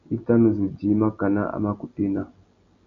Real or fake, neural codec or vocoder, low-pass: real; none; 7.2 kHz